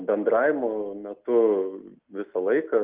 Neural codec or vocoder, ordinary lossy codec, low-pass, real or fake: none; Opus, 32 kbps; 3.6 kHz; real